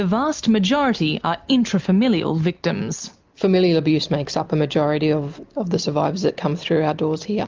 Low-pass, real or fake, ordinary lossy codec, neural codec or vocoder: 7.2 kHz; real; Opus, 24 kbps; none